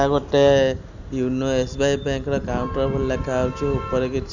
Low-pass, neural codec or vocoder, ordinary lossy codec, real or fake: 7.2 kHz; none; none; real